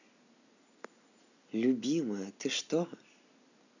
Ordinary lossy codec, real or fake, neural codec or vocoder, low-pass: none; real; none; 7.2 kHz